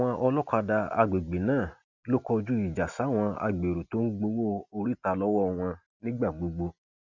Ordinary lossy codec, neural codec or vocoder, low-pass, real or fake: none; none; 7.2 kHz; real